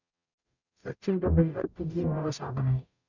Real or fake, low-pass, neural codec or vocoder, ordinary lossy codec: fake; 7.2 kHz; codec, 44.1 kHz, 0.9 kbps, DAC; none